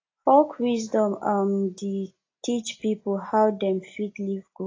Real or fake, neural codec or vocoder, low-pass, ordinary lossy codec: real; none; 7.2 kHz; AAC, 32 kbps